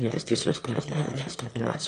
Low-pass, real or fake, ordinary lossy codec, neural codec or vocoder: 9.9 kHz; fake; AAC, 48 kbps; autoencoder, 22.05 kHz, a latent of 192 numbers a frame, VITS, trained on one speaker